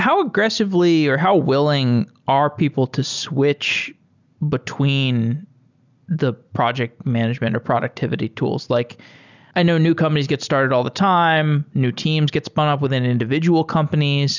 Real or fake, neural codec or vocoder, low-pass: real; none; 7.2 kHz